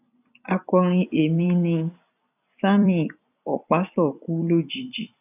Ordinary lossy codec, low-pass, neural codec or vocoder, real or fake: none; 3.6 kHz; vocoder, 44.1 kHz, 128 mel bands every 256 samples, BigVGAN v2; fake